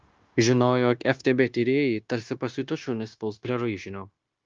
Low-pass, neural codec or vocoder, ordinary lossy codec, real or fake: 7.2 kHz; codec, 16 kHz, 0.9 kbps, LongCat-Audio-Codec; Opus, 32 kbps; fake